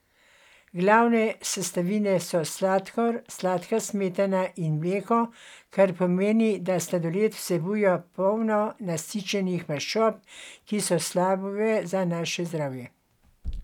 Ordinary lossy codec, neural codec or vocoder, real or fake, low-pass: none; none; real; 19.8 kHz